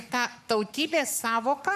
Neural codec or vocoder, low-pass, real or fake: codec, 44.1 kHz, 7.8 kbps, Pupu-Codec; 14.4 kHz; fake